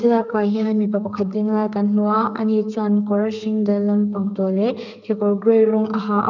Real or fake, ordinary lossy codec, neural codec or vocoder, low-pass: fake; none; codec, 32 kHz, 1.9 kbps, SNAC; 7.2 kHz